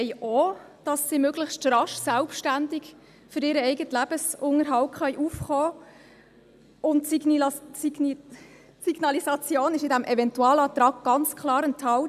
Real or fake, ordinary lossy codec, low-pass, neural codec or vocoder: real; none; 14.4 kHz; none